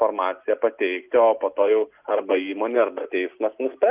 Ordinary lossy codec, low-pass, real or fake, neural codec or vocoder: Opus, 24 kbps; 3.6 kHz; real; none